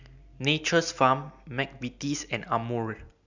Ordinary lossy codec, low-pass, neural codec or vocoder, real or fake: none; 7.2 kHz; none; real